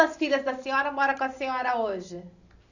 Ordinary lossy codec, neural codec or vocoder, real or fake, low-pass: none; none; real; 7.2 kHz